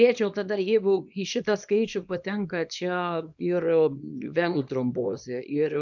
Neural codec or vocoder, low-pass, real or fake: codec, 24 kHz, 0.9 kbps, WavTokenizer, small release; 7.2 kHz; fake